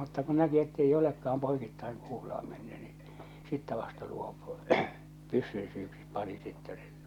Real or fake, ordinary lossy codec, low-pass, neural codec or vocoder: real; none; 19.8 kHz; none